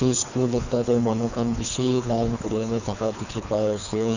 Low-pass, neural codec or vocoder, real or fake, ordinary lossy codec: 7.2 kHz; codec, 24 kHz, 3 kbps, HILCodec; fake; none